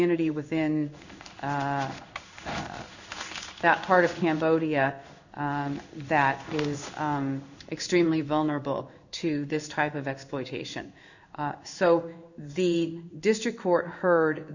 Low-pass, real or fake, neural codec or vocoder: 7.2 kHz; fake; codec, 16 kHz in and 24 kHz out, 1 kbps, XY-Tokenizer